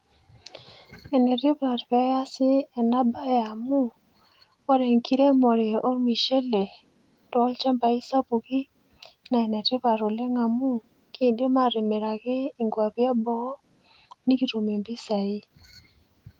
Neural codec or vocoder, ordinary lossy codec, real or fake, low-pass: autoencoder, 48 kHz, 128 numbers a frame, DAC-VAE, trained on Japanese speech; Opus, 24 kbps; fake; 19.8 kHz